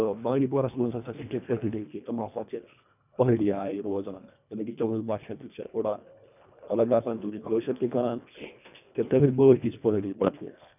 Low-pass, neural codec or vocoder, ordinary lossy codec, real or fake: 3.6 kHz; codec, 24 kHz, 1.5 kbps, HILCodec; none; fake